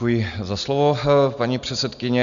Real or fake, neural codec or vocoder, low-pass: real; none; 7.2 kHz